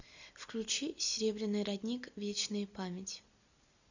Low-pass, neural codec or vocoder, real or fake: 7.2 kHz; none; real